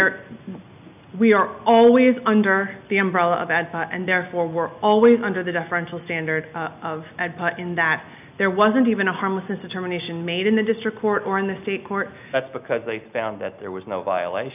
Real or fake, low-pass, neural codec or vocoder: real; 3.6 kHz; none